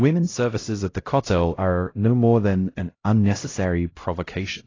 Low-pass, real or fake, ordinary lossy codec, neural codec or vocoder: 7.2 kHz; fake; AAC, 32 kbps; codec, 16 kHz, 0.5 kbps, X-Codec, HuBERT features, trained on LibriSpeech